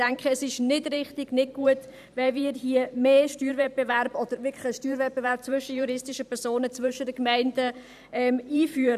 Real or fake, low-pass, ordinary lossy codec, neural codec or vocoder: fake; 14.4 kHz; none; vocoder, 44.1 kHz, 128 mel bands every 512 samples, BigVGAN v2